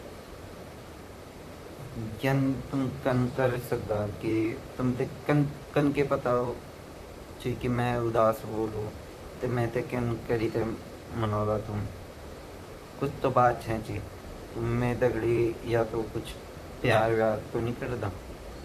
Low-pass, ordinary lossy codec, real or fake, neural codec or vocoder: 14.4 kHz; MP3, 96 kbps; fake; vocoder, 44.1 kHz, 128 mel bands, Pupu-Vocoder